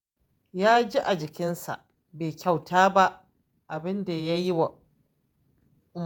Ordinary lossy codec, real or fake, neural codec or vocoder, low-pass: none; fake; vocoder, 48 kHz, 128 mel bands, Vocos; none